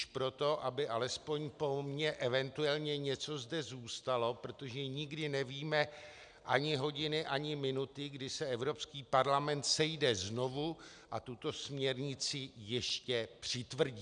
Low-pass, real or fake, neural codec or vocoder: 9.9 kHz; real; none